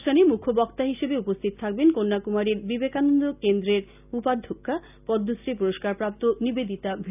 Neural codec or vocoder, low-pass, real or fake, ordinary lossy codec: none; 3.6 kHz; real; none